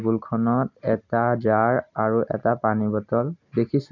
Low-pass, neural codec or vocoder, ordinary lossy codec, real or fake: 7.2 kHz; none; none; real